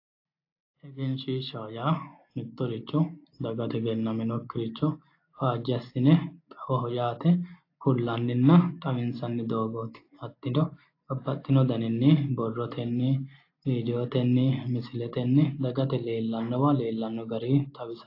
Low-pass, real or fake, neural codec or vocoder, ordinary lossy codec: 5.4 kHz; real; none; AAC, 32 kbps